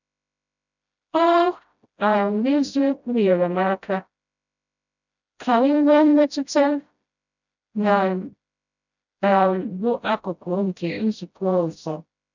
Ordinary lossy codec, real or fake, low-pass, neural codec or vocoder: none; fake; 7.2 kHz; codec, 16 kHz, 0.5 kbps, FreqCodec, smaller model